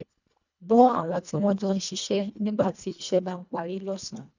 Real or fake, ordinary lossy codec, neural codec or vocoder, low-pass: fake; none; codec, 24 kHz, 1.5 kbps, HILCodec; 7.2 kHz